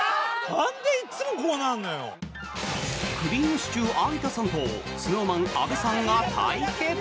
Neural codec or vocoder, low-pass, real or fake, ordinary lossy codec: none; none; real; none